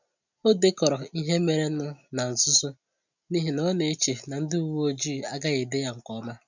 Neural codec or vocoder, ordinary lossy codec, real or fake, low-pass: none; none; real; 7.2 kHz